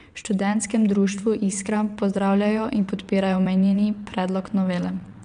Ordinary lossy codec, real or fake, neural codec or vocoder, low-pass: none; fake; vocoder, 22.05 kHz, 80 mel bands, WaveNeXt; 9.9 kHz